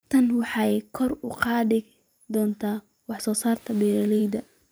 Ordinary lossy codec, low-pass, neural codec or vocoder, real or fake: none; none; vocoder, 44.1 kHz, 128 mel bands every 512 samples, BigVGAN v2; fake